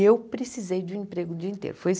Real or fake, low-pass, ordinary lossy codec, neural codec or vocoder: real; none; none; none